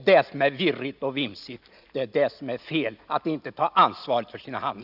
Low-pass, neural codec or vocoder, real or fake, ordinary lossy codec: 5.4 kHz; none; real; none